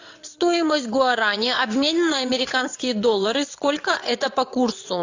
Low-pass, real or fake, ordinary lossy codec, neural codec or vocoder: 7.2 kHz; fake; AAC, 48 kbps; vocoder, 22.05 kHz, 80 mel bands, WaveNeXt